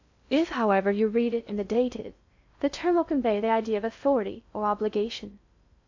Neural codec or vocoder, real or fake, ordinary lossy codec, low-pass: codec, 16 kHz in and 24 kHz out, 0.6 kbps, FocalCodec, streaming, 2048 codes; fake; AAC, 48 kbps; 7.2 kHz